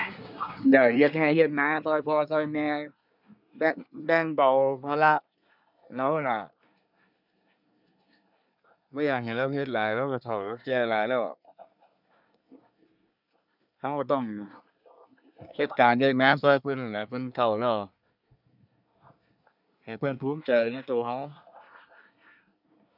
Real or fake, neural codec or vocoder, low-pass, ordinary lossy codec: fake; codec, 24 kHz, 1 kbps, SNAC; 5.4 kHz; none